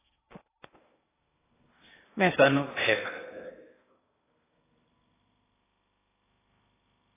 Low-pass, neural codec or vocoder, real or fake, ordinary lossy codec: 3.6 kHz; codec, 16 kHz in and 24 kHz out, 0.8 kbps, FocalCodec, streaming, 65536 codes; fake; AAC, 16 kbps